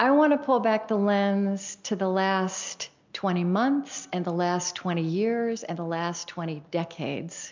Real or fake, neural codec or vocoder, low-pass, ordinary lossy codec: real; none; 7.2 kHz; MP3, 64 kbps